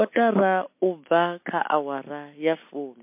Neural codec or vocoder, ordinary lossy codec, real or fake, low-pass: none; MP3, 24 kbps; real; 3.6 kHz